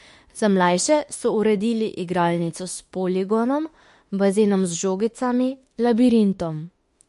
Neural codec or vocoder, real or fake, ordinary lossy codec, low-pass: autoencoder, 48 kHz, 32 numbers a frame, DAC-VAE, trained on Japanese speech; fake; MP3, 48 kbps; 14.4 kHz